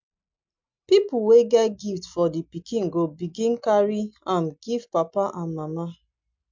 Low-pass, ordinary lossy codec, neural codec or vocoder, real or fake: 7.2 kHz; MP3, 64 kbps; none; real